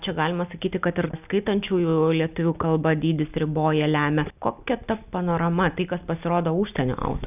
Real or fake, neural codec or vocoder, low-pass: real; none; 3.6 kHz